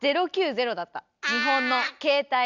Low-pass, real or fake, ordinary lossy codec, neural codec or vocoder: 7.2 kHz; real; none; none